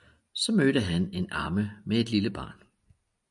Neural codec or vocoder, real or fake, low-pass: none; real; 10.8 kHz